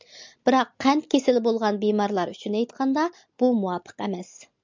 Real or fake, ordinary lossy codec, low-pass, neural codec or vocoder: real; MP3, 64 kbps; 7.2 kHz; none